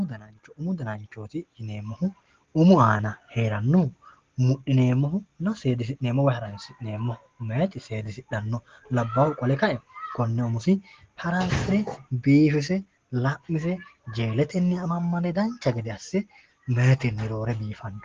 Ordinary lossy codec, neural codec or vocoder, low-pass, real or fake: Opus, 16 kbps; none; 7.2 kHz; real